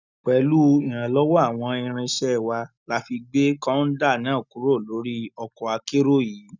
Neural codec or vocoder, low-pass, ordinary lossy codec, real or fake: none; 7.2 kHz; none; real